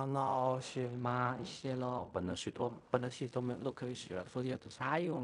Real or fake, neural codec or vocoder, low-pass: fake; codec, 16 kHz in and 24 kHz out, 0.4 kbps, LongCat-Audio-Codec, fine tuned four codebook decoder; 10.8 kHz